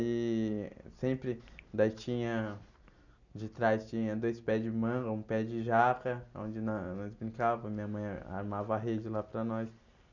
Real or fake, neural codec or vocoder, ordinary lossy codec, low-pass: real; none; none; 7.2 kHz